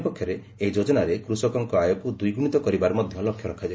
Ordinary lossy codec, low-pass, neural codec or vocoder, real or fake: none; none; none; real